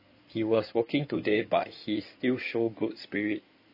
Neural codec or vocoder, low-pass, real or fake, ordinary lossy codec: codec, 16 kHz in and 24 kHz out, 2.2 kbps, FireRedTTS-2 codec; 5.4 kHz; fake; MP3, 24 kbps